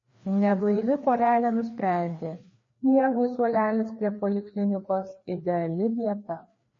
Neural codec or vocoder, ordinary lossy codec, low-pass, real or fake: codec, 16 kHz, 2 kbps, FreqCodec, larger model; MP3, 32 kbps; 7.2 kHz; fake